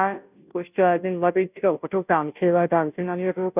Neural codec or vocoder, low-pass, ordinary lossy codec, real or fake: codec, 16 kHz, 0.5 kbps, FunCodec, trained on Chinese and English, 25 frames a second; 3.6 kHz; none; fake